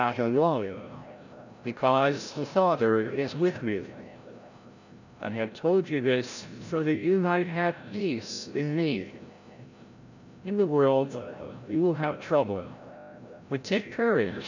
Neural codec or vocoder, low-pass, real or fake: codec, 16 kHz, 0.5 kbps, FreqCodec, larger model; 7.2 kHz; fake